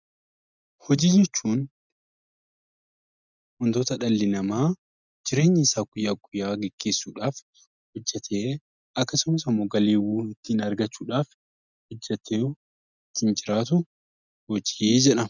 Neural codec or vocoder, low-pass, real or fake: none; 7.2 kHz; real